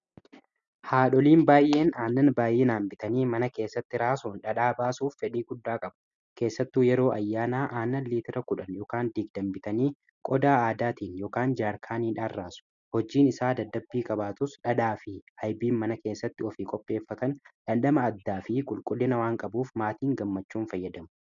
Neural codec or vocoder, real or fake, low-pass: none; real; 7.2 kHz